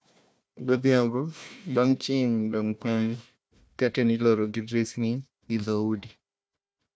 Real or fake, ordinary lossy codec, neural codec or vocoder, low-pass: fake; none; codec, 16 kHz, 1 kbps, FunCodec, trained on Chinese and English, 50 frames a second; none